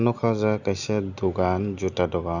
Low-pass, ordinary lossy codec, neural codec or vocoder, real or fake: 7.2 kHz; none; none; real